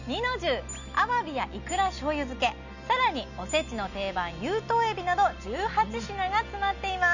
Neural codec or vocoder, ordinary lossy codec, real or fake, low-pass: none; none; real; 7.2 kHz